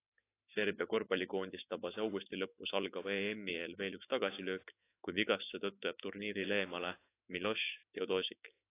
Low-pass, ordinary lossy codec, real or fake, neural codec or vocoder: 3.6 kHz; AAC, 24 kbps; fake; autoencoder, 48 kHz, 128 numbers a frame, DAC-VAE, trained on Japanese speech